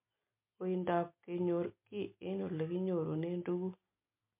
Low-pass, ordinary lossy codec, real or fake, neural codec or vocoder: 3.6 kHz; MP3, 32 kbps; real; none